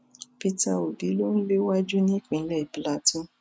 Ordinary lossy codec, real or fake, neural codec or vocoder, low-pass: none; real; none; none